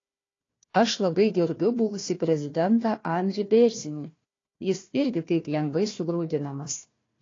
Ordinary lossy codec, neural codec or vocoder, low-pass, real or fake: AAC, 32 kbps; codec, 16 kHz, 1 kbps, FunCodec, trained on Chinese and English, 50 frames a second; 7.2 kHz; fake